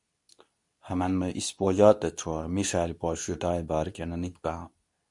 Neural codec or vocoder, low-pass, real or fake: codec, 24 kHz, 0.9 kbps, WavTokenizer, medium speech release version 2; 10.8 kHz; fake